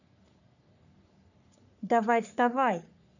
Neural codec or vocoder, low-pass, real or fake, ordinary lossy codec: codec, 44.1 kHz, 3.4 kbps, Pupu-Codec; 7.2 kHz; fake; none